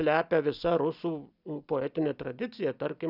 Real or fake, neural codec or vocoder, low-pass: real; none; 5.4 kHz